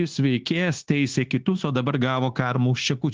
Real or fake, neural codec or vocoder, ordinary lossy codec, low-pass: fake; codec, 16 kHz, 0.9 kbps, LongCat-Audio-Codec; Opus, 16 kbps; 7.2 kHz